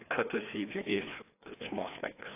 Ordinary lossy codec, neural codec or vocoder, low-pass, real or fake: AAC, 24 kbps; codec, 16 kHz, 2 kbps, FreqCodec, larger model; 3.6 kHz; fake